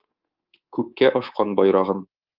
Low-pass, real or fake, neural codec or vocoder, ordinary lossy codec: 5.4 kHz; fake; codec, 24 kHz, 3.1 kbps, DualCodec; Opus, 32 kbps